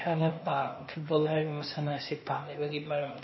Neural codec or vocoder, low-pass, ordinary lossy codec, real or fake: codec, 16 kHz, 0.8 kbps, ZipCodec; 7.2 kHz; MP3, 24 kbps; fake